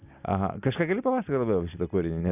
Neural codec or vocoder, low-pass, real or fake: none; 3.6 kHz; real